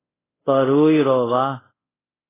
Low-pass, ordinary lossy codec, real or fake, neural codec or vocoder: 3.6 kHz; AAC, 16 kbps; fake; codec, 24 kHz, 0.5 kbps, DualCodec